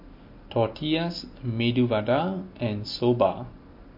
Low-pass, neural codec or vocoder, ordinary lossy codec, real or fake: 5.4 kHz; none; MP3, 32 kbps; real